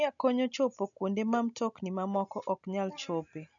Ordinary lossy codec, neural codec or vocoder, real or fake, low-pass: none; none; real; 7.2 kHz